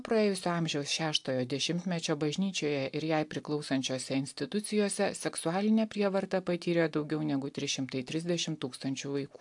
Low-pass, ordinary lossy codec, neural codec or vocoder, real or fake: 10.8 kHz; AAC, 64 kbps; none; real